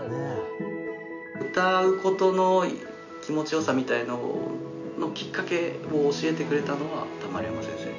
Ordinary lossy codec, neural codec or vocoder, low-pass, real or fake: none; none; 7.2 kHz; real